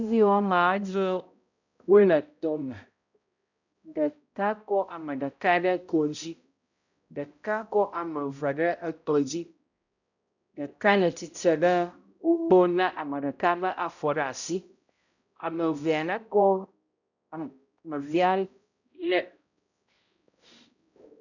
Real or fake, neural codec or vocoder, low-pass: fake; codec, 16 kHz, 0.5 kbps, X-Codec, HuBERT features, trained on balanced general audio; 7.2 kHz